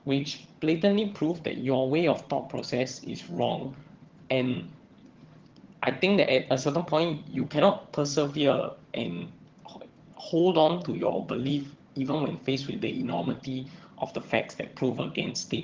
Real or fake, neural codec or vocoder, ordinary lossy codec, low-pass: fake; vocoder, 22.05 kHz, 80 mel bands, HiFi-GAN; Opus, 16 kbps; 7.2 kHz